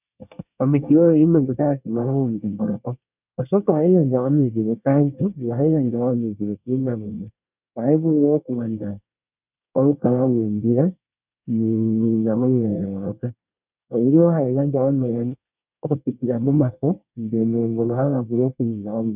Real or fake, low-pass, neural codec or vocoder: fake; 3.6 kHz; codec, 24 kHz, 1 kbps, SNAC